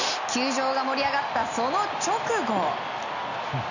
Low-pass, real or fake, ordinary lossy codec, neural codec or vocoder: 7.2 kHz; real; none; none